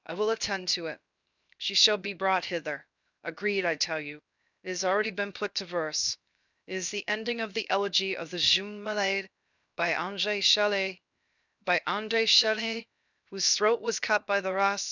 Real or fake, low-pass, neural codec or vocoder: fake; 7.2 kHz; codec, 16 kHz, 0.7 kbps, FocalCodec